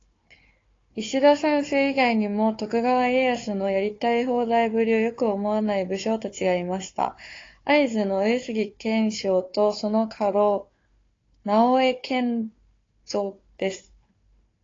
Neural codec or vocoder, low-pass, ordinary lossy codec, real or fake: codec, 16 kHz, 4 kbps, FunCodec, trained on Chinese and English, 50 frames a second; 7.2 kHz; AAC, 32 kbps; fake